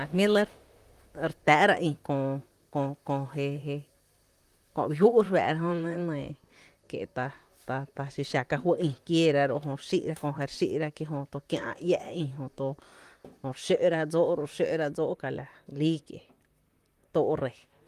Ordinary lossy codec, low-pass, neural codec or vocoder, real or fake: Opus, 32 kbps; 14.4 kHz; vocoder, 44.1 kHz, 128 mel bands, Pupu-Vocoder; fake